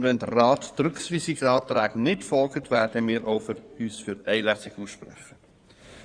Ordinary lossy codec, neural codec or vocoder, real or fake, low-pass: Opus, 64 kbps; codec, 16 kHz in and 24 kHz out, 2.2 kbps, FireRedTTS-2 codec; fake; 9.9 kHz